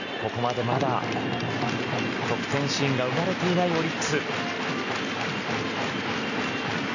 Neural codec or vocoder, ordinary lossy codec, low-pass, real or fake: none; none; 7.2 kHz; real